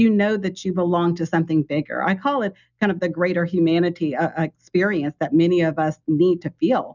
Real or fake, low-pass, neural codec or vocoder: real; 7.2 kHz; none